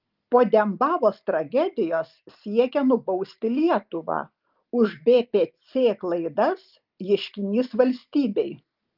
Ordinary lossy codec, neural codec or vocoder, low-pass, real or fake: Opus, 32 kbps; none; 5.4 kHz; real